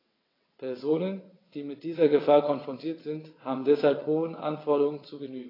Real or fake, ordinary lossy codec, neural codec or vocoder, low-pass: fake; AAC, 24 kbps; vocoder, 22.05 kHz, 80 mel bands, WaveNeXt; 5.4 kHz